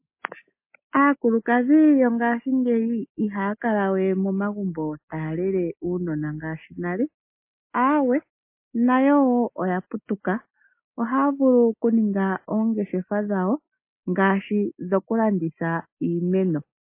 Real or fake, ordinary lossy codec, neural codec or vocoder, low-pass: real; MP3, 24 kbps; none; 3.6 kHz